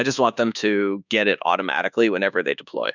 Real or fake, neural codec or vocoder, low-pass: fake; codec, 24 kHz, 1.2 kbps, DualCodec; 7.2 kHz